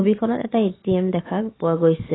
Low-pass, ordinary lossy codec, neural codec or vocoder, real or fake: 7.2 kHz; AAC, 16 kbps; none; real